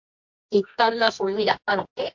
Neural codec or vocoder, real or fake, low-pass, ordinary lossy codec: codec, 24 kHz, 0.9 kbps, WavTokenizer, medium music audio release; fake; 7.2 kHz; MP3, 48 kbps